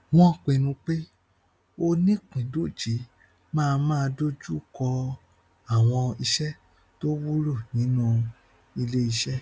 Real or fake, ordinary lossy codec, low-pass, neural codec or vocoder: real; none; none; none